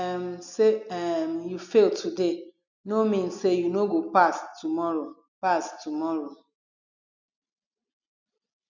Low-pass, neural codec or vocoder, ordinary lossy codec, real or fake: 7.2 kHz; none; none; real